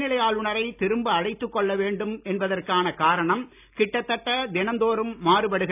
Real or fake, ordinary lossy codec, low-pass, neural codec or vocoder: real; none; 3.6 kHz; none